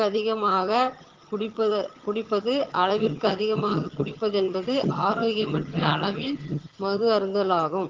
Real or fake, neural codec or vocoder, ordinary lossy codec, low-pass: fake; vocoder, 22.05 kHz, 80 mel bands, HiFi-GAN; Opus, 16 kbps; 7.2 kHz